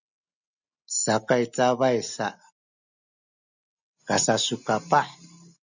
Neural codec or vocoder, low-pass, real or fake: none; 7.2 kHz; real